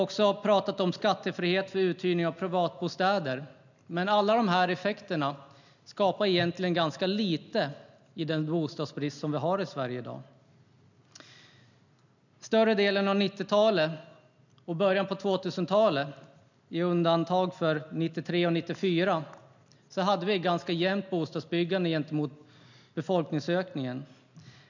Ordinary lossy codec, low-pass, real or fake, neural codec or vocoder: none; 7.2 kHz; real; none